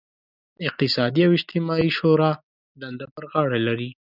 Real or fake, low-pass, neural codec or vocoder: real; 5.4 kHz; none